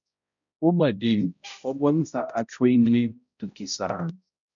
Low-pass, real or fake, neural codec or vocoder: 7.2 kHz; fake; codec, 16 kHz, 0.5 kbps, X-Codec, HuBERT features, trained on balanced general audio